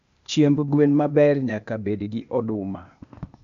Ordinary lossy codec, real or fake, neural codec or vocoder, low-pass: AAC, 96 kbps; fake; codec, 16 kHz, 0.8 kbps, ZipCodec; 7.2 kHz